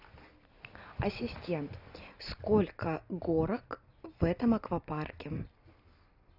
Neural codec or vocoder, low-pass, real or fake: none; 5.4 kHz; real